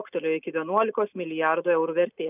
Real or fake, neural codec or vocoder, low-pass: real; none; 3.6 kHz